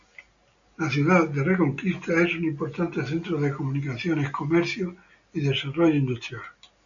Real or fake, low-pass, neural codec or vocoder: real; 7.2 kHz; none